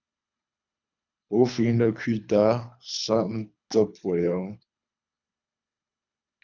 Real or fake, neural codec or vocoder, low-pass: fake; codec, 24 kHz, 3 kbps, HILCodec; 7.2 kHz